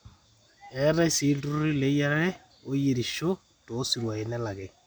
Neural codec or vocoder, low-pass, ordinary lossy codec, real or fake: none; none; none; real